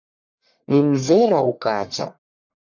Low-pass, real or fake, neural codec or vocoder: 7.2 kHz; fake; codec, 44.1 kHz, 1.7 kbps, Pupu-Codec